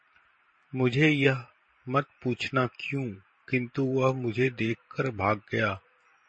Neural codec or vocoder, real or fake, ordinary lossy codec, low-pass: vocoder, 44.1 kHz, 128 mel bands every 512 samples, BigVGAN v2; fake; MP3, 32 kbps; 9.9 kHz